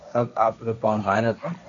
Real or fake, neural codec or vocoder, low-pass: fake; codec, 16 kHz, 1.1 kbps, Voila-Tokenizer; 7.2 kHz